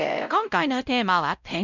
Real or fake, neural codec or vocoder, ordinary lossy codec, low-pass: fake; codec, 16 kHz, 0.5 kbps, X-Codec, WavLM features, trained on Multilingual LibriSpeech; none; 7.2 kHz